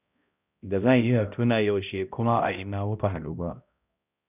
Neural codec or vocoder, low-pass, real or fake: codec, 16 kHz, 0.5 kbps, X-Codec, HuBERT features, trained on balanced general audio; 3.6 kHz; fake